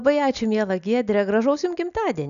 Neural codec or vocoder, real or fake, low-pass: none; real; 7.2 kHz